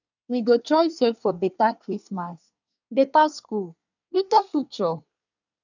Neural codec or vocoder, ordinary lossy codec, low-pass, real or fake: codec, 24 kHz, 1 kbps, SNAC; none; 7.2 kHz; fake